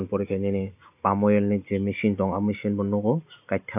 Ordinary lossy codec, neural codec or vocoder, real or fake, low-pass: none; none; real; 3.6 kHz